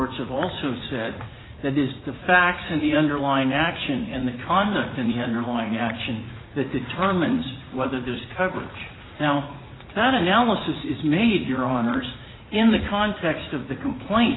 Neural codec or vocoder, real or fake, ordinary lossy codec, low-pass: vocoder, 44.1 kHz, 80 mel bands, Vocos; fake; AAC, 16 kbps; 7.2 kHz